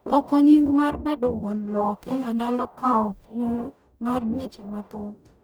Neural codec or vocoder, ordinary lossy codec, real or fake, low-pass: codec, 44.1 kHz, 0.9 kbps, DAC; none; fake; none